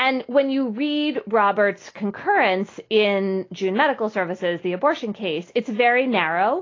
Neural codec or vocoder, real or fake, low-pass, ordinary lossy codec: none; real; 7.2 kHz; AAC, 32 kbps